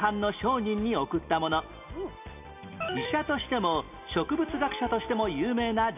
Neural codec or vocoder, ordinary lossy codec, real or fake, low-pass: none; none; real; 3.6 kHz